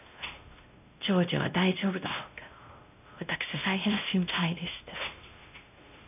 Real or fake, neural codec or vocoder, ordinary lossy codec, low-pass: fake; codec, 16 kHz, 1 kbps, X-Codec, WavLM features, trained on Multilingual LibriSpeech; none; 3.6 kHz